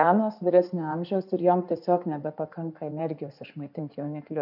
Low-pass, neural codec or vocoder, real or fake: 5.4 kHz; codec, 16 kHz in and 24 kHz out, 2.2 kbps, FireRedTTS-2 codec; fake